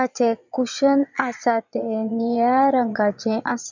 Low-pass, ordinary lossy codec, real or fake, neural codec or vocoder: 7.2 kHz; none; fake; vocoder, 22.05 kHz, 80 mel bands, Vocos